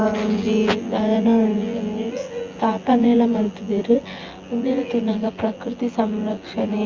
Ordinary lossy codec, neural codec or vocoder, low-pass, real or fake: Opus, 32 kbps; vocoder, 24 kHz, 100 mel bands, Vocos; 7.2 kHz; fake